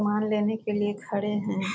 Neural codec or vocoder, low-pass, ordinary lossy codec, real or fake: none; none; none; real